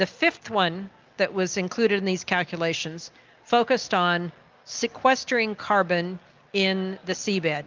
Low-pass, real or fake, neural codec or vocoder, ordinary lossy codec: 7.2 kHz; real; none; Opus, 24 kbps